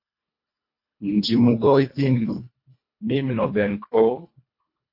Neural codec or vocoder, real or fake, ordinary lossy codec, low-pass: codec, 24 kHz, 1.5 kbps, HILCodec; fake; MP3, 32 kbps; 5.4 kHz